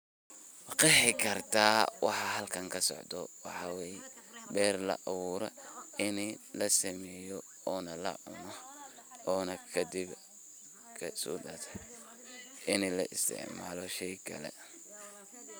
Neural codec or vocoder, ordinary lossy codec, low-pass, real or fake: none; none; none; real